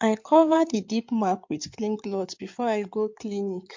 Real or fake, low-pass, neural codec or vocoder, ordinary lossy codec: fake; 7.2 kHz; codec, 16 kHz in and 24 kHz out, 2.2 kbps, FireRedTTS-2 codec; MP3, 48 kbps